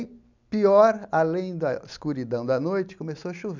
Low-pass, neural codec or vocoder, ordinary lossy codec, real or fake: 7.2 kHz; none; none; real